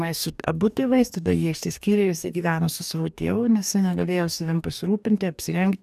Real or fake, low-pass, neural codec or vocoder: fake; 14.4 kHz; codec, 44.1 kHz, 2.6 kbps, DAC